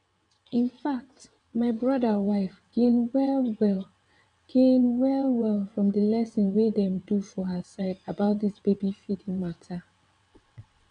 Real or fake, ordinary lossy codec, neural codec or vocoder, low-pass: fake; none; vocoder, 22.05 kHz, 80 mel bands, WaveNeXt; 9.9 kHz